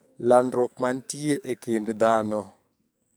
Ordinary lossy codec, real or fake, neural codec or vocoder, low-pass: none; fake; codec, 44.1 kHz, 2.6 kbps, SNAC; none